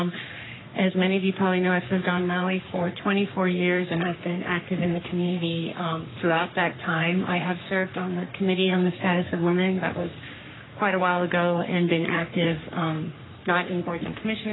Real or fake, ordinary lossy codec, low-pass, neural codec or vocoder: fake; AAC, 16 kbps; 7.2 kHz; codec, 44.1 kHz, 3.4 kbps, Pupu-Codec